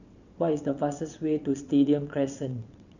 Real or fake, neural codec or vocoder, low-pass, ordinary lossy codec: fake; vocoder, 22.05 kHz, 80 mel bands, WaveNeXt; 7.2 kHz; none